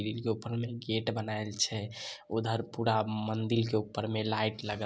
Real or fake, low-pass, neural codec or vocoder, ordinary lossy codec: real; none; none; none